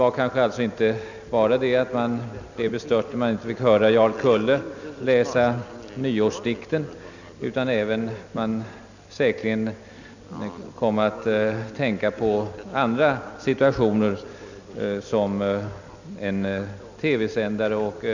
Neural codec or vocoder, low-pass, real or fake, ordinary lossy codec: none; 7.2 kHz; real; none